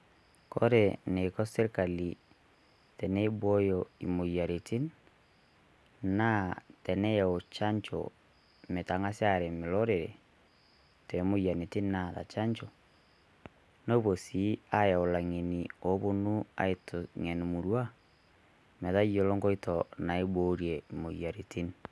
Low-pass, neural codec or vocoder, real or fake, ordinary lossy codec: none; none; real; none